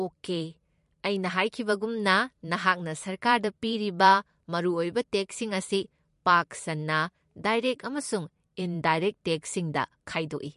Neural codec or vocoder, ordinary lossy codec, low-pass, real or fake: vocoder, 44.1 kHz, 128 mel bands every 512 samples, BigVGAN v2; MP3, 48 kbps; 14.4 kHz; fake